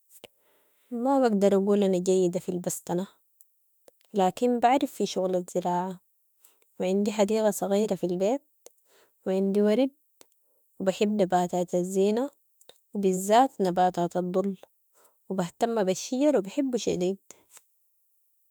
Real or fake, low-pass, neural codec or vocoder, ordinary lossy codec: fake; none; autoencoder, 48 kHz, 32 numbers a frame, DAC-VAE, trained on Japanese speech; none